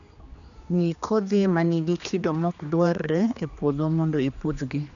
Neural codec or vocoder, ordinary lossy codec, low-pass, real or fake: codec, 16 kHz, 2 kbps, X-Codec, HuBERT features, trained on general audio; none; 7.2 kHz; fake